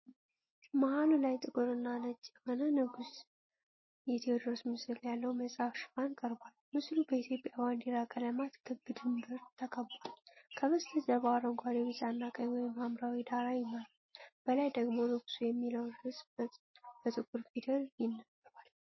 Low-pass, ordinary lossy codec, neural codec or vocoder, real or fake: 7.2 kHz; MP3, 24 kbps; none; real